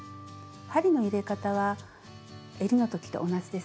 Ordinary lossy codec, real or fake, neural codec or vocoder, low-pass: none; real; none; none